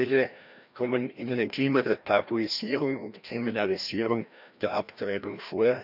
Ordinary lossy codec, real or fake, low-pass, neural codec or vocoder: none; fake; 5.4 kHz; codec, 16 kHz, 1 kbps, FreqCodec, larger model